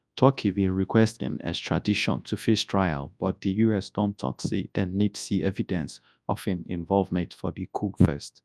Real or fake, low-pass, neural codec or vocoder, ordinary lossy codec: fake; none; codec, 24 kHz, 0.9 kbps, WavTokenizer, large speech release; none